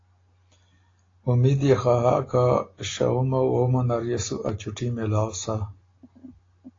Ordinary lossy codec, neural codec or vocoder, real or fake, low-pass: AAC, 32 kbps; none; real; 7.2 kHz